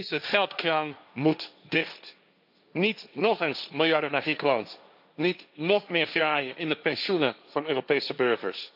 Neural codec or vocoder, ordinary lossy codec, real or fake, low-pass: codec, 16 kHz, 1.1 kbps, Voila-Tokenizer; none; fake; 5.4 kHz